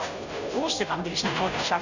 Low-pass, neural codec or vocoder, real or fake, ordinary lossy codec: 7.2 kHz; codec, 16 kHz, 0.5 kbps, FunCodec, trained on Chinese and English, 25 frames a second; fake; none